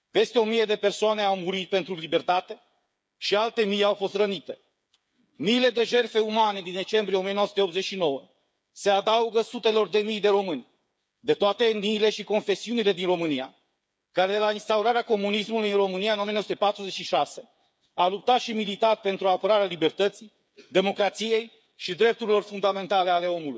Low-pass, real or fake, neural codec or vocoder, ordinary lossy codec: none; fake; codec, 16 kHz, 8 kbps, FreqCodec, smaller model; none